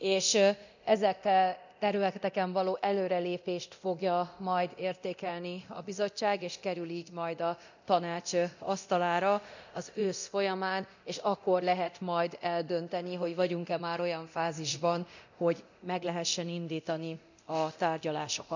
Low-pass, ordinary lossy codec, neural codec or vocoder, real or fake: 7.2 kHz; none; codec, 24 kHz, 0.9 kbps, DualCodec; fake